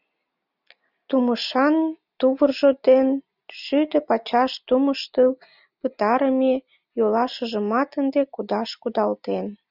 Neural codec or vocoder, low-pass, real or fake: none; 5.4 kHz; real